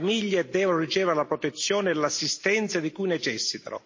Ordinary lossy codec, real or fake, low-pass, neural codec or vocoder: MP3, 32 kbps; real; 7.2 kHz; none